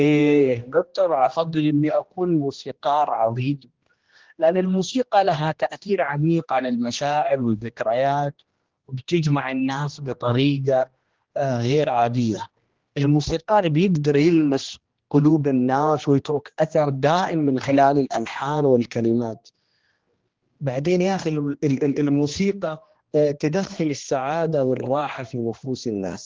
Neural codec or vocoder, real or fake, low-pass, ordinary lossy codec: codec, 16 kHz, 1 kbps, X-Codec, HuBERT features, trained on general audio; fake; 7.2 kHz; Opus, 16 kbps